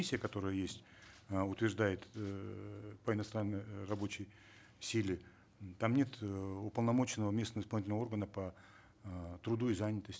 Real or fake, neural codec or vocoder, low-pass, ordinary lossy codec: real; none; none; none